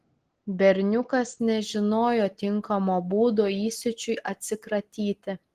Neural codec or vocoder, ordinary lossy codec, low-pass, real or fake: none; Opus, 16 kbps; 14.4 kHz; real